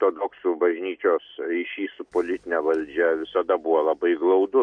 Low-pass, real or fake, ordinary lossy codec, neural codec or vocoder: 9.9 kHz; real; MP3, 48 kbps; none